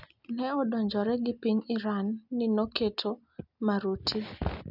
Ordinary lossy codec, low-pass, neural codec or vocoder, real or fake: none; 5.4 kHz; none; real